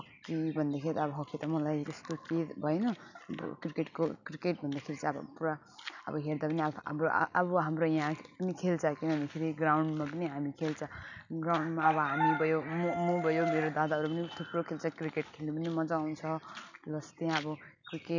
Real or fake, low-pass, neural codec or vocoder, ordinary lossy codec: real; 7.2 kHz; none; none